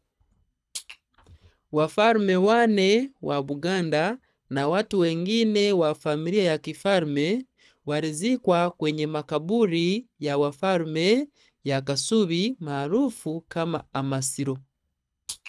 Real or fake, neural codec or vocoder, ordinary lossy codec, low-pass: fake; codec, 24 kHz, 6 kbps, HILCodec; none; none